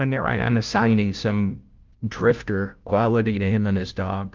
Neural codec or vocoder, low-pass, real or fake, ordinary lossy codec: codec, 16 kHz, 0.5 kbps, FunCodec, trained on Chinese and English, 25 frames a second; 7.2 kHz; fake; Opus, 24 kbps